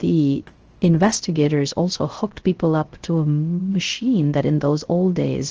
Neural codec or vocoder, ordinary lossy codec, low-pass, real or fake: codec, 16 kHz, 0.3 kbps, FocalCodec; Opus, 16 kbps; 7.2 kHz; fake